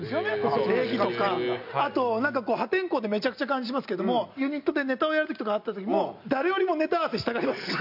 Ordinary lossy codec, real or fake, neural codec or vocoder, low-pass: none; real; none; 5.4 kHz